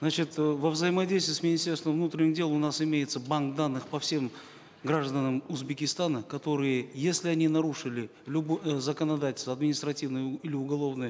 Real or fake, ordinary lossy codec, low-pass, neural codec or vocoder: real; none; none; none